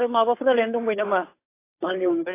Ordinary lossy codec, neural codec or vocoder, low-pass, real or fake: AAC, 24 kbps; codec, 16 kHz, 8 kbps, FunCodec, trained on Chinese and English, 25 frames a second; 3.6 kHz; fake